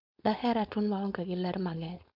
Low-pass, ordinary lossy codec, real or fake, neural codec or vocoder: 5.4 kHz; MP3, 48 kbps; fake; codec, 16 kHz, 4.8 kbps, FACodec